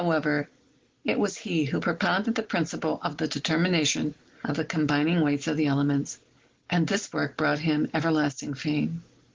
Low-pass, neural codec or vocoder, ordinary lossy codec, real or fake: 7.2 kHz; none; Opus, 16 kbps; real